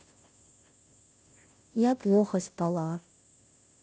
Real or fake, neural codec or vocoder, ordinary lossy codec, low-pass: fake; codec, 16 kHz, 0.5 kbps, FunCodec, trained on Chinese and English, 25 frames a second; none; none